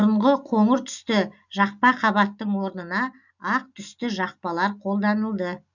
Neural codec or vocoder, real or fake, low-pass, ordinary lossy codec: none; real; 7.2 kHz; none